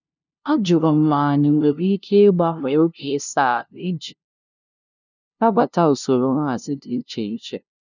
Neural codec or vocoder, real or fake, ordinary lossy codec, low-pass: codec, 16 kHz, 0.5 kbps, FunCodec, trained on LibriTTS, 25 frames a second; fake; none; 7.2 kHz